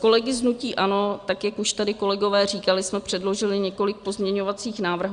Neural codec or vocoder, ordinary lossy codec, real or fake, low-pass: none; Opus, 64 kbps; real; 9.9 kHz